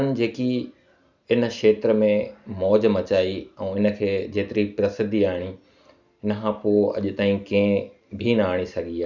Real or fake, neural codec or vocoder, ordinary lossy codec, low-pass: real; none; none; 7.2 kHz